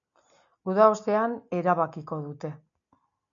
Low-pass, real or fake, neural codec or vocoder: 7.2 kHz; real; none